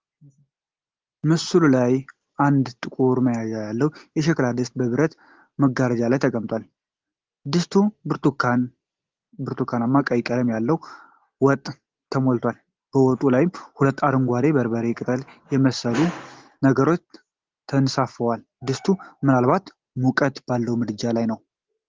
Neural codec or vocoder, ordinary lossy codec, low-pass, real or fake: none; Opus, 16 kbps; 7.2 kHz; real